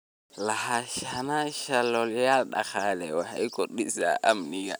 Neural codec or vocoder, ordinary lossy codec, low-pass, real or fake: none; none; none; real